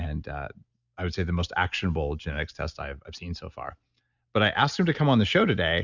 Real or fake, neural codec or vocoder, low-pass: real; none; 7.2 kHz